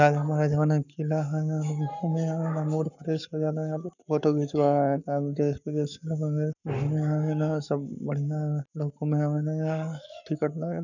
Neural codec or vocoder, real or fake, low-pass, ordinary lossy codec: codec, 44.1 kHz, 7.8 kbps, Pupu-Codec; fake; 7.2 kHz; none